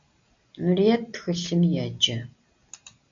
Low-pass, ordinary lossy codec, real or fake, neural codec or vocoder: 7.2 kHz; MP3, 64 kbps; real; none